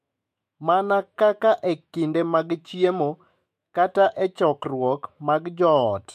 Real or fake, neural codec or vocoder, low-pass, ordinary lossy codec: fake; autoencoder, 48 kHz, 128 numbers a frame, DAC-VAE, trained on Japanese speech; 14.4 kHz; MP3, 64 kbps